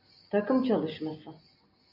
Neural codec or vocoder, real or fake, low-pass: none; real; 5.4 kHz